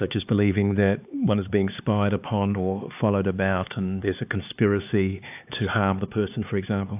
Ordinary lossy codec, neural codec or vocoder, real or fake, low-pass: AAC, 32 kbps; codec, 16 kHz, 4 kbps, X-Codec, HuBERT features, trained on LibriSpeech; fake; 3.6 kHz